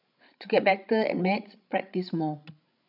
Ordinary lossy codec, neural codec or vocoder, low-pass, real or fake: none; codec, 16 kHz, 16 kbps, FreqCodec, larger model; 5.4 kHz; fake